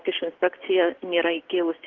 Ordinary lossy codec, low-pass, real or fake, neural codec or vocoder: Opus, 16 kbps; 7.2 kHz; real; none